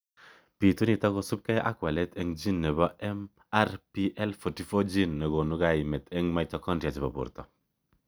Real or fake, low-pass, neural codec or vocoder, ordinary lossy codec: real; none; none; none